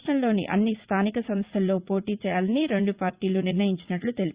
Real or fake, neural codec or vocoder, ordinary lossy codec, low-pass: fake; vocoder, 44.1 kHz, 80 mel bands, Vocos; Opus, 64 kbps; 3.6 kHz